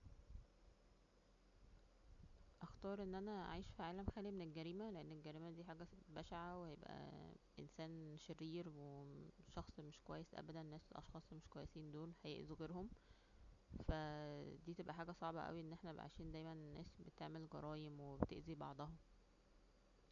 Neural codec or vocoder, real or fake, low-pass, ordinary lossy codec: none; real; 7.2 kHz; none